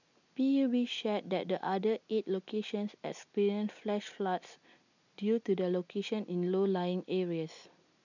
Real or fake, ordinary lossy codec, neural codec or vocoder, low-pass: real; none; none; 7.2 kHz